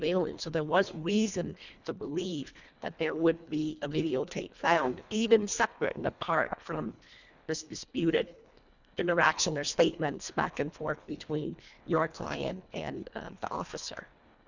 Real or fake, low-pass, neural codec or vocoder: fake; 7.2 kHz; codec, 24 kHz, 1.5 kbps, HILCodec